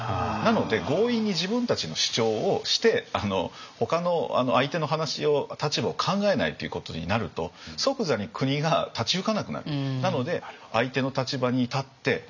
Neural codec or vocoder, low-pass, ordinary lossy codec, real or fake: none; 7.2 kHz; none; real